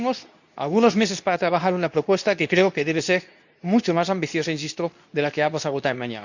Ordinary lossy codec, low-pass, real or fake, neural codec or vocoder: none; 7.2 kHz; fake; codec, 24 kHz, 0.9 kbps, WavTokenizer, medium speech release version 2